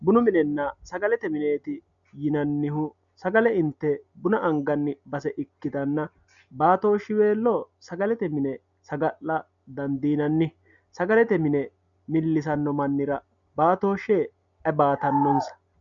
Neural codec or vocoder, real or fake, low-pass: none; real; 7.2 kHz